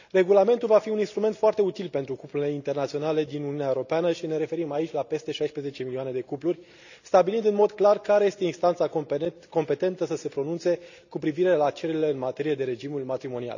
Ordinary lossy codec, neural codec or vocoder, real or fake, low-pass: none; none; real; 7.2 kHz